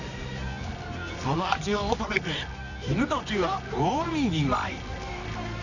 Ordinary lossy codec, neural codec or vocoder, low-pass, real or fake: none; codec, 24 kHz, 0.9 kbps, WavTokenizer, medium music audio release; 7.2 kHz; fake